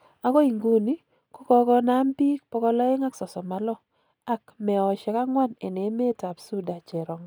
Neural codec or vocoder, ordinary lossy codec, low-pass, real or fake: none; none; none; real